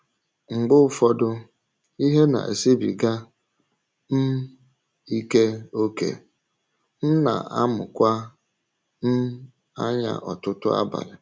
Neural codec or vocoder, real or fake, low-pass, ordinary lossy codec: none; real; none; none